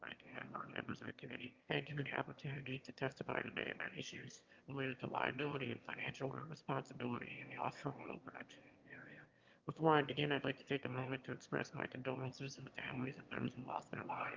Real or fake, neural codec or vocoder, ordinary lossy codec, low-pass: fake; autoencoder, 22.05 kHz, a latent of 192 numbers a frame, VITS, trained on one speaker; Opus, 24 kbps; 7.2 kHz